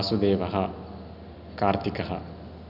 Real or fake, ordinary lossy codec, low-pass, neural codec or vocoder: real; none; 5.4 kHz; none